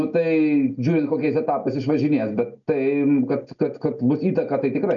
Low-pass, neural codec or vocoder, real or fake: 7.2 kHz; none; real